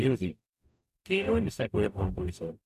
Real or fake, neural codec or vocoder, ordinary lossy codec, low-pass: fake; codec, 44.1 kHz, 0.9 kbps, DAC; none; 14.4 kHz